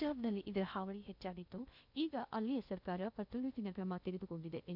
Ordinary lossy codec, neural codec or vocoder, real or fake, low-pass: none; codec, 16 kHz in and 24 kHz out, 0.6 kbps, FocalCodec, streaming, 2048 codes; fake; 5.4 kHz